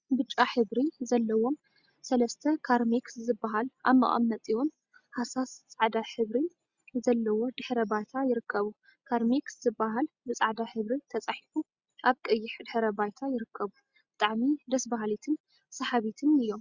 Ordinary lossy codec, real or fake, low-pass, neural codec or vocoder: Opus, 64 kbps; real; 7.2 kHz; none